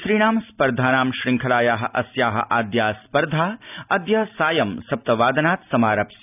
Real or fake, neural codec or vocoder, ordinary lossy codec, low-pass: real; none; none; 3.6 kHz